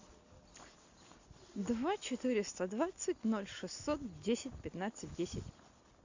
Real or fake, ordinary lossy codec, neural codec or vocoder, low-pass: real; AAC, 48 kbps; none; 7.2 kHz